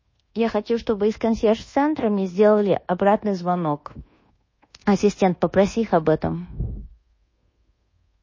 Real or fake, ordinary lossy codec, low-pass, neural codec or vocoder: fake; MP3, 32 kbps; 7.2 kHz; codec, 24 kHz, 1.2 kbps, DualCodec